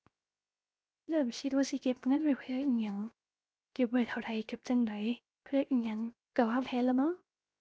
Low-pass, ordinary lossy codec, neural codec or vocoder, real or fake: none; none; codec, 16 kHz, 0.7 kbps, FocalCodec; fake